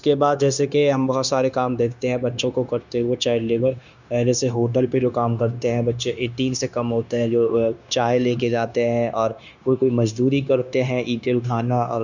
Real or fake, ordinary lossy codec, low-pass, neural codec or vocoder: fake; none; 7.2 kHz; codec, 16 kHz, 0.9 kbps, LongCat-Audio-Codec